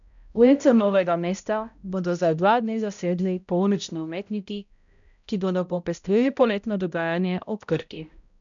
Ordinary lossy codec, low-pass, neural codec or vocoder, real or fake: none; 7.2 kHz; codec, 16 kHz, 0.5 kbps, X-Codec, HuBERT features, trained on balanced general audio; fake